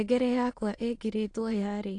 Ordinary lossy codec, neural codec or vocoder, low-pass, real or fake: AAC, 48 kbps; autoencoder, 22.05 kHz, a latent of 192 numbers a frame, VITS, trained on many speakers; 9.9 kHz; fake